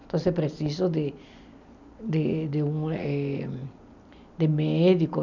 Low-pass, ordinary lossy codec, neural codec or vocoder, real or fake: 7.2 kHz; none; none; real